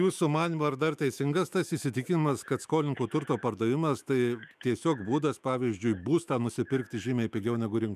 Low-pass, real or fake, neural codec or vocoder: 14.4 kHz; fake; autoencoder, 48 kHz, 128 numbers a frame, DAC-VAE, trained on Japanese speech